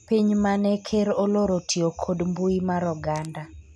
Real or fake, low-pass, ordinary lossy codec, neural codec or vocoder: real; none; none; none